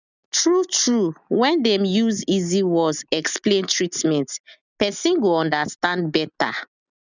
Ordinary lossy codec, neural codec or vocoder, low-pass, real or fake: none; none; 7.2 kHz; real